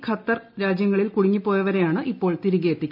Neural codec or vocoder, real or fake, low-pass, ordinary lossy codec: none; real; 5.4 kHz; none